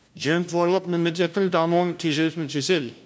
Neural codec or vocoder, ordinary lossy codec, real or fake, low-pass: codec, 16 kHz, 0.5 kbps, FunCodec, trained on LibriTTS, 25 frames a second; none; fake; none